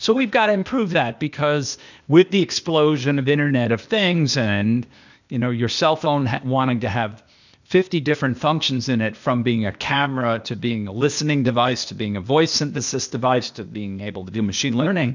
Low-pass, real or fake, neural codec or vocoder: 7.2 kHz; fake; codec, 16 kHz, 0.8 kbps, ZipCodec